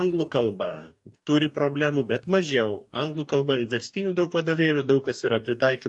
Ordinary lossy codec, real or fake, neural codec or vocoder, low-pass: AAC, 64 kbps; fake; codec, 44.1 kHz, 2.6 kbps, DAC; 10.8 kHz